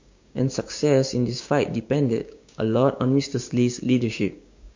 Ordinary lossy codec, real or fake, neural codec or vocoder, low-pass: MP3, 48 kbps; fake; codec, 16 kHz, 6 kbps, DAC; 7.2 kHz